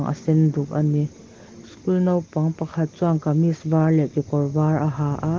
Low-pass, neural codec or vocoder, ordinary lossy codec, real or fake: 7.2 kHz; none; Opus, 16 kbps; real